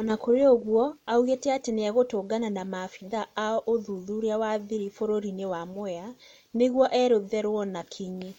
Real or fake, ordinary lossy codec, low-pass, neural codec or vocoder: real; MP3, 64 kbps; 19.8 kHz; none